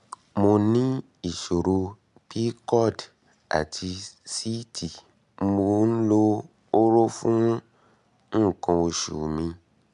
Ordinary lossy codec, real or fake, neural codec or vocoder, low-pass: MP3, 96 kbps; real; none; 10.8 kHz